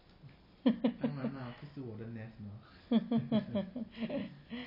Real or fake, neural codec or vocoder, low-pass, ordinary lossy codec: real; none; 5.4 kHz; MP3, 24 kbps